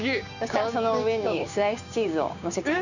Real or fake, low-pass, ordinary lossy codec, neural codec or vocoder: fake; 7.2 kHz; none; codec, 16 kHz, 6 kbps, DAC